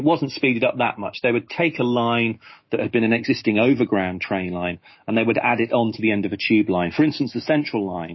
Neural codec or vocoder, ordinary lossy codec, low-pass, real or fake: autoencoder, 48 kHz, 128 numbers a frame, DAC-VAE, trained on Japanese speech; MP3, 24 kbps; 7.2 kHz; fake